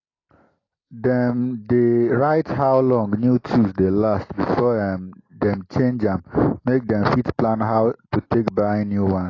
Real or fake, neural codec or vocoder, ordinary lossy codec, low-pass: real; none; AAC, 32 kbps; 7.2 kHz